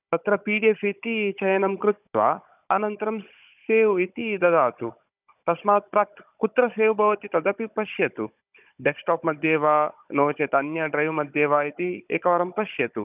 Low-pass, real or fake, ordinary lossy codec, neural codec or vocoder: 3.6 kHz; fake; none; codec, 16 kHz, 16 kbps, FunCodec, trained on Chinese and English, 50 frames a second